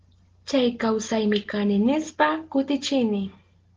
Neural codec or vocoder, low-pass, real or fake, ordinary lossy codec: none; 7.2 kHz; real; Opus, 16 kbps